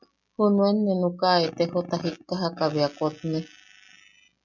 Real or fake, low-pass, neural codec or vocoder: real; 7.2 kHz; none